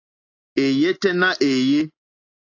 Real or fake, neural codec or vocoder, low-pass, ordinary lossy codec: real; none; 7.2 kHz; AAC, 48 kbps